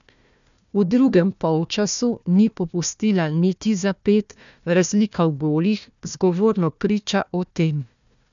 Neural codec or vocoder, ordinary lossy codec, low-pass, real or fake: codec, 16 kHz, 1 kbps, FunCodec, trained on Chinese and English, 50 frames a second; none; 7.2 kHz; fake